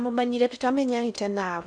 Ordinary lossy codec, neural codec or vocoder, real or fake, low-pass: none; codec, 16 kHz in and 24 kHz out, 0.6 kbps, FocalCodec, streaming, 4096 codes; fake; 9.9 kHz